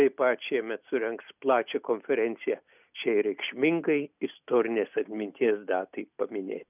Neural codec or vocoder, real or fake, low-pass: none; real; 3.6 kHz